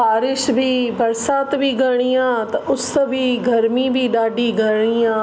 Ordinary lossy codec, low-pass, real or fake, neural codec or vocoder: none; none; real; none